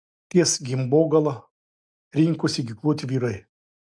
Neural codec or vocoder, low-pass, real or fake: none; 9.9 kHz; real